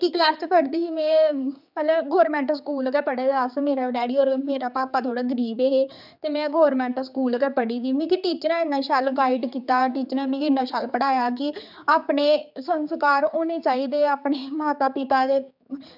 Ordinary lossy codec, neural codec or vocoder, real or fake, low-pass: none; codec, 16 kHz, 4 kbps, X-Codec, HuBERT features, trained on general audio; fake; 5.4 kHz